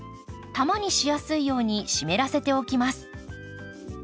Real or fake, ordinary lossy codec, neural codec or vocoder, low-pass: real; none; none; none